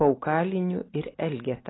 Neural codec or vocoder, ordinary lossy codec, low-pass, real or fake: none; AAC, 16 kbps; 7.2 kHz; real